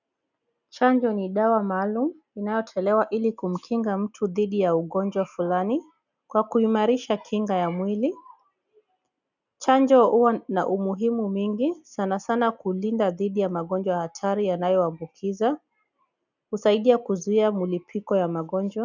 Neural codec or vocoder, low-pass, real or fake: none; 7.2 kHz; real